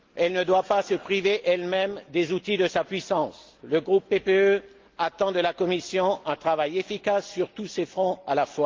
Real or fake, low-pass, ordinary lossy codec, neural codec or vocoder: real; 7.2 kHz; Opus, 32 kbps; none